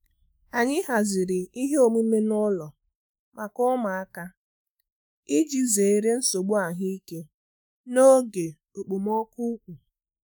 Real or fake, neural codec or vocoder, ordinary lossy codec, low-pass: fake; autoencoder, 48 kHz, 128 numbers a frame, DAC-VAE, trained on Japanese speech; none; none